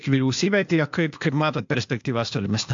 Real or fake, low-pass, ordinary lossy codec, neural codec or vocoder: fake; 7.2 kHz; AAC, 64 kbps; codec, 16 kHz, 0.8 kbps, ZipCodec